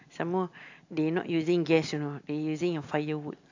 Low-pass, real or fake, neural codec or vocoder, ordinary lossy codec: 7.2 kHz; real; none; AAC, 48 kbps